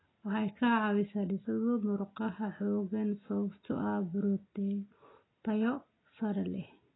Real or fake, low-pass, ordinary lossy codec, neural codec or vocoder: real; 7.2 kHz; AAC, 16 kbps; none